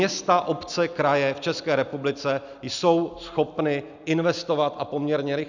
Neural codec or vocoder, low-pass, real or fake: none; 7.2 kHz; real